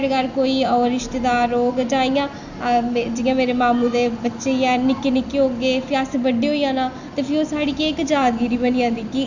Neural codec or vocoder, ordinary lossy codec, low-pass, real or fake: none; none; 7.2 kHz; real